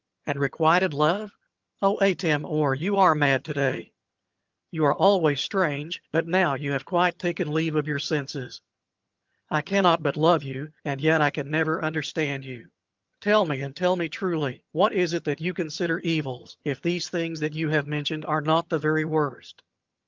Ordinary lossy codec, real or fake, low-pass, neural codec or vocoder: Opus, 24 kbps; fake; 7.2 kHz; vocoder, 22.05 kHz, 80 mel bands, HiFi-GAN